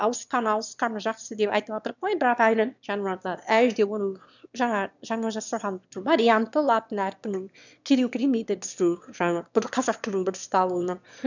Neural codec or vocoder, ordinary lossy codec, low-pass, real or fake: autoencoder, 22.05 kHz, a latent of 192 numbers a frame, VITS, trained on one speaker; none; 7.2 kHz; fake